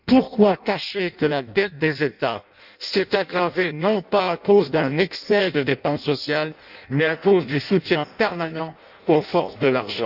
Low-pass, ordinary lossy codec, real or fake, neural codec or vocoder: 5.4 kHz; none; fake; codec, 16 kHz in and 24 kHz out, 0.6 kbps, FireRedTTS-2 codec